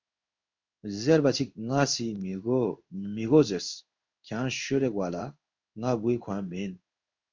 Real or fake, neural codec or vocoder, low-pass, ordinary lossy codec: fake; codec, 16 kHz in and 24 kHz out, 1 kbps, XY-Tokenizer; 7.2 kHz; MP3, 64 kbps